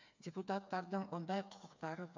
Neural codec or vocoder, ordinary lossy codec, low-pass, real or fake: codec, 16 kHz, 4 kbps, FreqCodec, smaller model; MP3, 64 kbps; 7.2 kHz; fake